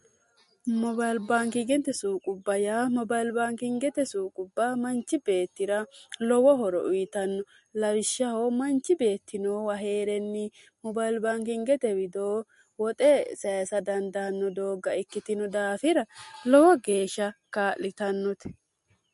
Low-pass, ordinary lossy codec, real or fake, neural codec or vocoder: 14.4 kHz; MP3, 48 kbps; real; none